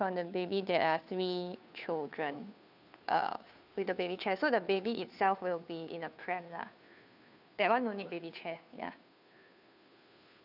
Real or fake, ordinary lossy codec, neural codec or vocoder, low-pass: fake; none; codec, 16 kHz, 2 kbps, FunCodec, trained on Chinese and English, 25 frames a second; 5.4 kHz